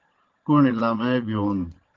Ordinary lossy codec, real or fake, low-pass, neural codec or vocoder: Opus, 16 kbps; fake; 7.2 kHz; vocoder, 22.05 kHz, 80 mel bands, Vocos